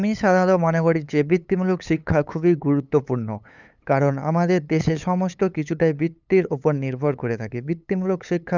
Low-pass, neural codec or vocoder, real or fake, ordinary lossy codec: 7.2 kHz; codec, 16 kHz, 8 kbps, FunCodec, trained on LibriTTS, 25 frames a second; fake; none